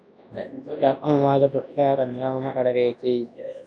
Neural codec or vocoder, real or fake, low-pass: codec, 24 kHz, 0.9 kbps, WavTokenizer, large speech release; fake; 9.9 kHz